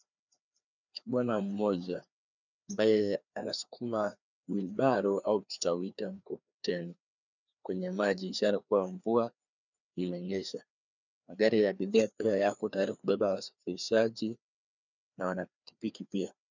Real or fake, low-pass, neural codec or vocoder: fake; 7.2 kHz; codec, 16 kHz, 2 kbps, FreqCodec, larger model